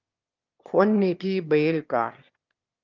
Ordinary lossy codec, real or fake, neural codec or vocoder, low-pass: Opus, 32 kbps; fake; autoencoder, 22.05 kHz, a latent of 192 numbers a frame, VITS, trained on one speaker; 7.2 kHz